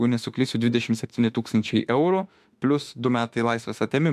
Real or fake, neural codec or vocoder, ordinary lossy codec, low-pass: fake; autoencoder, 48 kHz, 32 numbers a frame, DAC-VAE, trained on Japanese speech; MP3, 96 kbps; 14.4 kHz